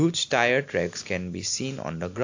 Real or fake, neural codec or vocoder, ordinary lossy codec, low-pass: real; none; AAC, 48 kbps; 7.2 kHz